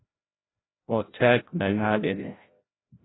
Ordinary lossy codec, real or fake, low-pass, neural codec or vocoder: AAC, 16 kbps; fake; 7.2 kHz; codec, 16 kHz, 0.5 kbps, FreqCodec, larger model